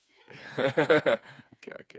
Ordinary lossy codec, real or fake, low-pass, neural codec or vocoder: none; fake; none; codec, 16 kHz, 4 kbps, FreqCodec, smaller model